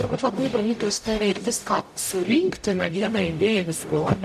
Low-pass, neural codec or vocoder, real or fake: 14.4 kHz; codec, 44.1 kHz, 0.9 kbps, DAC; fake